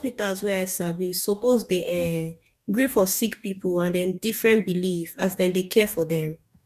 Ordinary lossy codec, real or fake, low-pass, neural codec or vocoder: none; fake; 14.4 kHz; codec, 44.1 kHz, 2.6 kbps, DAC